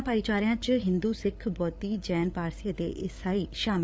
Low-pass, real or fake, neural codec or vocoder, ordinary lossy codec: none; fake; codec, 16 kHz, 8 kbps, FreqCodec, larger model; none